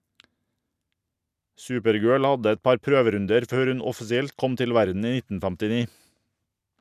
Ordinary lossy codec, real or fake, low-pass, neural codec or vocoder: none; real; 14.4 kHz; none